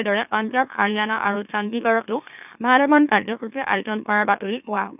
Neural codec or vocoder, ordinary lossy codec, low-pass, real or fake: autoencoder, 44.1 kHz, a latent of 192 numbers a frame, MeloTTS; none; 3.6 kHz; fake